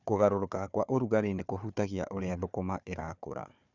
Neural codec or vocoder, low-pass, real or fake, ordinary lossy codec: codec, 16 kHz, 4 kbps, FunCodec, trained on Chinese and English, 50 frames a second; 7.2 kHz; fake; none